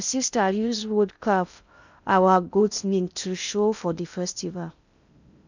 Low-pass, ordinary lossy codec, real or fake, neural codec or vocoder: 7.2 kHz; none; fake; codec, 16 kHz in and 24 kHz out, 0.6 kbps, FocalCodec, streaming, 2048 codes